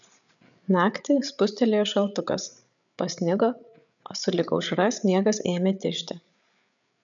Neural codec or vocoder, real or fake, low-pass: codec, 16 kHz, 8 kbps, FreqCodec, larger model; fake; 7.2 kHz